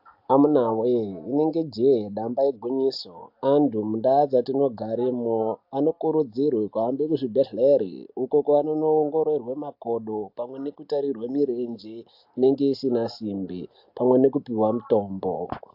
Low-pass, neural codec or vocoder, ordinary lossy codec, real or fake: 5.4 kHz; none; AAC, 48 kbps; real